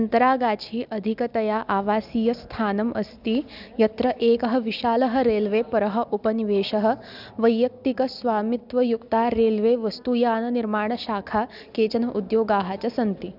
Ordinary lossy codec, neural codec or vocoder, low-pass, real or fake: none; none; 5.4 kHz; real